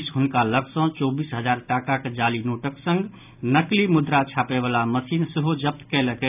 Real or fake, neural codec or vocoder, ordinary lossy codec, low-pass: real; none; none; 3.6 kHz